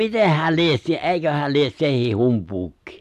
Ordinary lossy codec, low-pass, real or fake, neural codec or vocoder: AAC, 96 kbps; 14.4 kHz; real; none